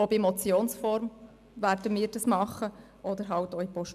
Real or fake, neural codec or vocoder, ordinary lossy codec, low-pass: real; none; none; 14.4 kHz